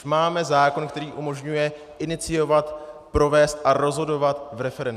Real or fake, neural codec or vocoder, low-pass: real; none; 14.4 kHz